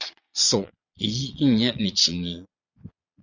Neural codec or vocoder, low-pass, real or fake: vocoder, 22.05 kHz, 80 mel bands, Vocos; 7.2 kHz; fake